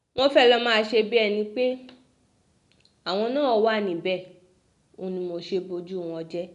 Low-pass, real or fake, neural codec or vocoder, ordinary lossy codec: 10.8 kHz; real; none; none